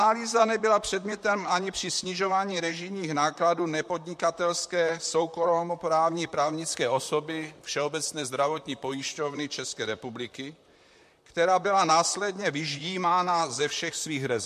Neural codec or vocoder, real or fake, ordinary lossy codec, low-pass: vocoder, 44.1 kHz, 128 mel bands, Pupu-Vocoder; fake; MP3, 64 kbps; 14.4 kHz